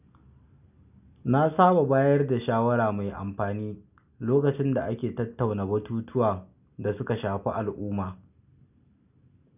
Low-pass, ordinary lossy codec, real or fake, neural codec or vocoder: 3.6 kHz; none; real; none